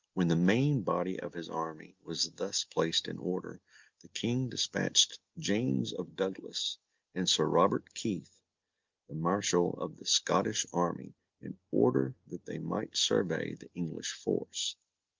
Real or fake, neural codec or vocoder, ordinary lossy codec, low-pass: real; none; Opus, 24 kbps; 7.2 kHz